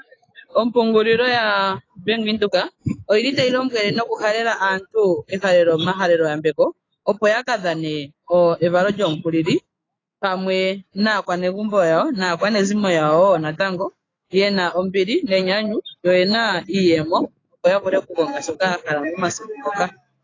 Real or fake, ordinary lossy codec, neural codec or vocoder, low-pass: fake; AAC, 32 kbps; autoencoder, 48 kHz, 128 numbers a frame, DAC-VAE, trained on Japanese speech; 7.2 kHz